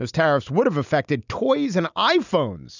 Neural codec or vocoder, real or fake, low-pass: none; real; 7.2 kHz